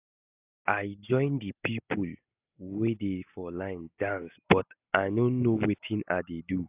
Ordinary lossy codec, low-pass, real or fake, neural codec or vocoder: AAC, 32 kbps; 3.6 kHz; real; none